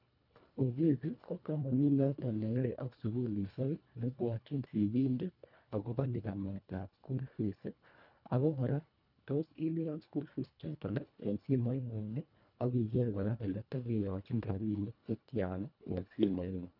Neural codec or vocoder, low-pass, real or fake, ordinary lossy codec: codec, 24 kHz, 1.5 kbps, HILCodec; 5.4 kHz; fake; none